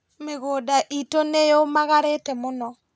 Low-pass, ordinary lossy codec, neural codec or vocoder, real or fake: none; none; none; real